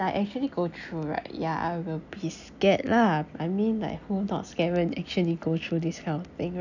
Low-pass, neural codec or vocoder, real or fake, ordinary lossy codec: 7.2 kHz; none; real; none